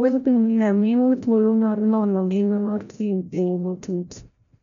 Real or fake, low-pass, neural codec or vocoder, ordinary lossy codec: fake; 7.2 kHz; codec, 16 kHz, 0.5 kbps, FreqCodec, larger model; MP3, 96 kbps